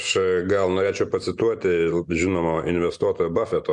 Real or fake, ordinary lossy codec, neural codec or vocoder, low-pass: real; MP3, 96 kbps; none; 10.8 kHz